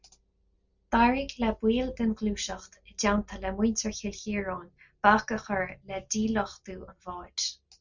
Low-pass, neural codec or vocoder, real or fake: 7.2 kHz; none; real